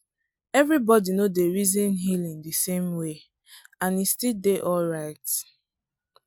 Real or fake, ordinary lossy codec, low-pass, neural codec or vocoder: real; none; none; none